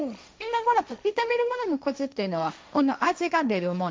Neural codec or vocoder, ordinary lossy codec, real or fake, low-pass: codec, 16 kHz, 1.1 kbps, Voila-Tokenizer; none; fake; none